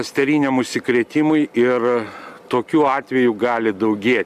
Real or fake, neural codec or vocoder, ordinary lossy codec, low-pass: real; none; MP3, 96 kbps; 14.4 kHz